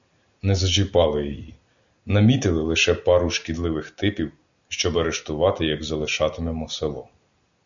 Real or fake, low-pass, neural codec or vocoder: real; 7.2 kHz; none